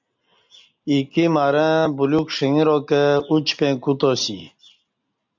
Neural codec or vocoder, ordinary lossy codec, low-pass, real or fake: none; MP3, 64 kbps; 7.2 kHz; real